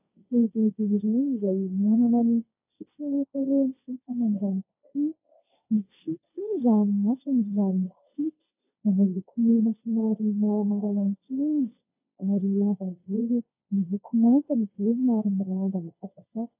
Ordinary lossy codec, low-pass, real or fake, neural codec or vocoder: AAC, 32 kbps; 3.6 kHz; fake; codec, 16 kHz, 1.1 kbps, Voila-Tokenizer